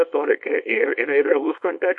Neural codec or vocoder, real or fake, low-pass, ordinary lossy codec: codec, 24 kHz, 0.9 kbps, WavTokenizer, small release; fake; 10.8 kHz; MP3, 48 kbps